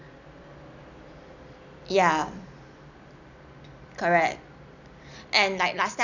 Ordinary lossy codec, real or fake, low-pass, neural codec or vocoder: none; real; 7.2 kHz; none